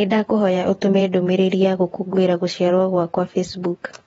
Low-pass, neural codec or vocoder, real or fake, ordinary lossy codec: 19.8 kHz; vocoder, 48 kHz, 128 mel bands, Vocos; fake; AAC, 24 kbps